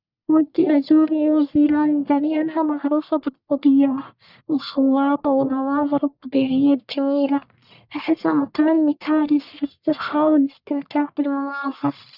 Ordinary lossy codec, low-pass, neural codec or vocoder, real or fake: none; 5.4 kHz; codec, 44.1 kHz, 1.7 kbps, Pupu-Codec; fake